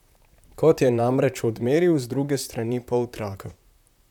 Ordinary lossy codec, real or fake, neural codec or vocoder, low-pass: none; fake; vocoder, 44.1 kHz, 128 mel bands, Pupu-Vocoder; 19.8 kHz